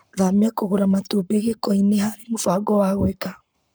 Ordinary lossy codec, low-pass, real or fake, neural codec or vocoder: none; none; fake; codec, 44.1 kHz, 7.8 kbps, Pupu-Codec